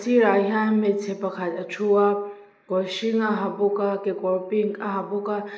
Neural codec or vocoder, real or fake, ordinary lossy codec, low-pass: none; real; none; none